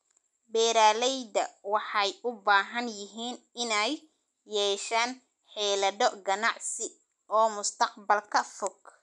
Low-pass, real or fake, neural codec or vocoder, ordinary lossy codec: 10.8 kHz; real; none; none